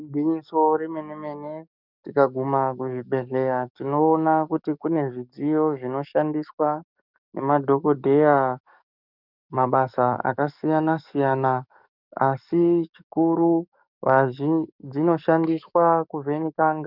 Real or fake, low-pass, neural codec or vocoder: fake; 5.4 kHz; codec, 44.1 kHz, 7.8 kbps, DAC